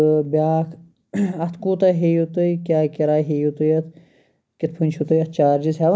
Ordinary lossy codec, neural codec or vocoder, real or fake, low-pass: none; none; real; none